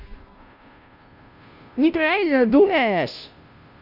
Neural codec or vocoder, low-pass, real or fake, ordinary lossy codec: codec, 16 kHz, 0.5 kbps, FunCodec, trained on Chinese and English, 25 frames a second; 5.4 kHz; fake; none